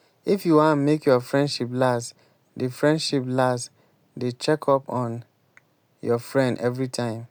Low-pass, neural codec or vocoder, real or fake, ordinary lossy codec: none; none; real; none